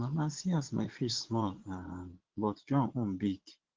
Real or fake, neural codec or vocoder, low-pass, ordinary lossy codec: fake; vocoder, 22.05 kHz, 80 mel bands, Vocos; 7.2 kHz; Opus, 16 kbps